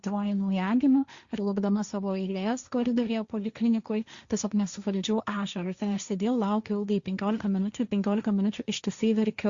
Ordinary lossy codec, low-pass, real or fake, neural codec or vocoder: Opus, 64 kbps; 7.2 kHz; fake; codec, 16 kHz, 1.1 kbps, Voila-Tokenizer